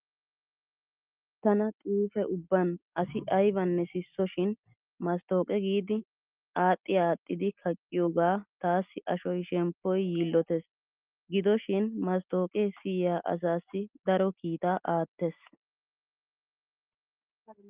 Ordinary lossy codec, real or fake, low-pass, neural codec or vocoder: Opus, 24 kbps; real; 3.6 kHz; none